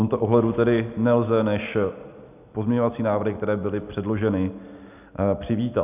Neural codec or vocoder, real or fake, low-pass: none; real; 3.6 kHz